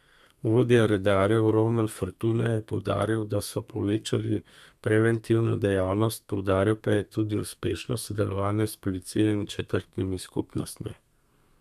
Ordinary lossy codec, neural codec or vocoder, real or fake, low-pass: none; codec, 32 kHz, 1.9 kbps, SNAC; fake; 14.4 kHz